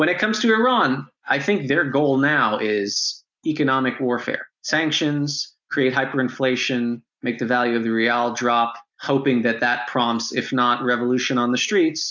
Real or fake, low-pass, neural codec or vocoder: real; 7.2 kHz; none